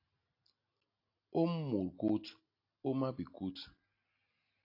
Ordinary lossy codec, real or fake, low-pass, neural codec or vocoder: AAC, 32 kbps; real; 5.4 kHz; none